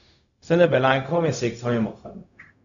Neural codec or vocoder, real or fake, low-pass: codec, 16 kHz, 0.4 kbps, LongCat-Audio-Codec; fake; 7.2 kHz